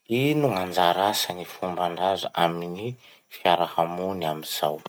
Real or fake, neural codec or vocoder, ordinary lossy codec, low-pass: real; none; none; none